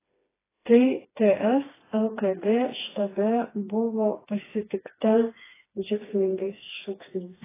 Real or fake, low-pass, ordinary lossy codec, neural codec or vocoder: fake; 3.6 kHz; AAC, 16 kbps; codec, 16 kHz, 2 kbps, FreqCodec, smaller model